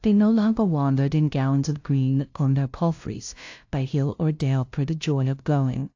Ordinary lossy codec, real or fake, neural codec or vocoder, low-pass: AAC, 48 kbps; fake; codec, 16 kHz, 0.5 kbps, FunCodec, trained on LibriTTS, 25 frames a second; 7.2 kHz